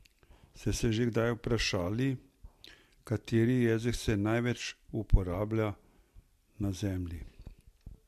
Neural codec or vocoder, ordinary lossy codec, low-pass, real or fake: none; MP3, 64 kbps; 14.4 kHz; real